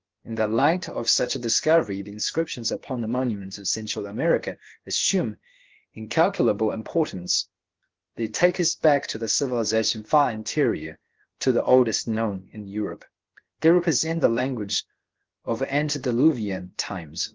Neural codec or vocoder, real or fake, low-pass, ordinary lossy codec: codec, 16 kHz, about 1 kbps, DyCAST, with the encoder's durations; fake; 7.2 kHz; Opus, 16 kbps